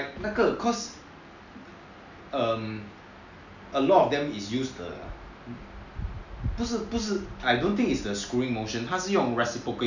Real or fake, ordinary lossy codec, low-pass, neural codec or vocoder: real; none; 7.2 kHz; none